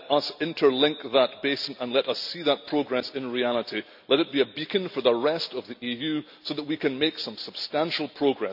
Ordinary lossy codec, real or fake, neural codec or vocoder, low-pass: none; real; none; 5.4 kHz